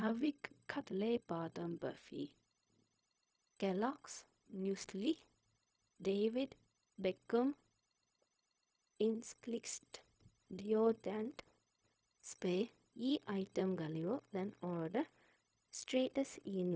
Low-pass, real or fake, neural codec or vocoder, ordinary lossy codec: none; fake; codec, 16 kHz, 0.4 kbps, LongCat-Audio-Codec; none